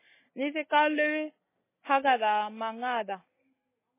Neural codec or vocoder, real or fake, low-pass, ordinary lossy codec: none; real; 3.6 kHz; MP3, 16 kbps